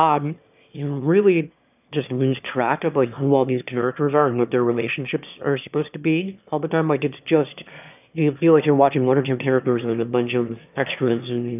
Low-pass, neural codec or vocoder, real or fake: 3.6 kHz; autoencoder, 22.05 kHz, a latent of 192 numbers a frame, VITS, trained on one speaker; fake